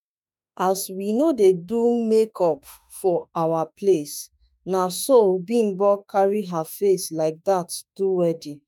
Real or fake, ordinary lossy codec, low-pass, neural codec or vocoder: fake; none; 19.8 kHz; autoencoder, 48 kHz, 32 numbers a frame, DAC-VAE, trained on Japanese speech